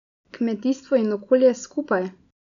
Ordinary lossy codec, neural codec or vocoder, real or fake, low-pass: none; none; real; 7.2 kHz